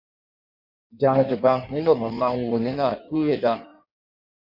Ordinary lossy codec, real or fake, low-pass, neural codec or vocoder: Opus, 64 kbps; fake; 5.4 kHz; codec, 16 kHz in and 24 kHz out, 1.1 kbps, FireRedTTS-2 codec